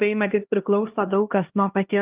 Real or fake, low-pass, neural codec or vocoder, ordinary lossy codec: fake; 3.6 kHz; codec, 16 kHz, 1 kbps, X-Codec, HuBERT features, trained on LibriSpeech; Opus, 24 kbps